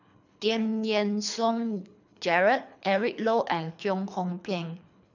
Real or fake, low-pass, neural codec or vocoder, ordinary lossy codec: fake; 7.2 kHz; codec, 24 kHz, 3 kbps, HILCodec; none